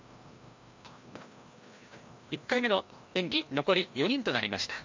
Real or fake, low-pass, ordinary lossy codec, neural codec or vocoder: fake; 7.2 kHz; MP3, 48 kbps; codec, 16 kHz, 1 kbps, FreqCodec, larger model